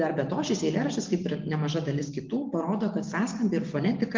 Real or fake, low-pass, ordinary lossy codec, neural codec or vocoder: real; 7.2 kHz; Opus, 32 kbps; none